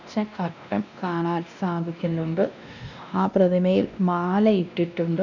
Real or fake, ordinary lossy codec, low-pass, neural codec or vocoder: fake; none; 7.2 kHz; codec, 16 kHz, 1 kbps, X-Codec, WavLM features, trained on Multilingual LibriSpeech